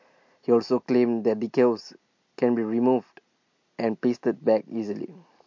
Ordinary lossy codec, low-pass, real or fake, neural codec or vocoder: MP3, 48 kbps; 7.2 kHz; real; none